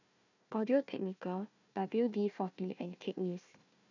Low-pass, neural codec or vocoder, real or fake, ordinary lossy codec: 7.2 kHz; codec, 16 kHz, 1 kbps, FunCodec, trained on Chinese and English, 50 frames a second; fake; none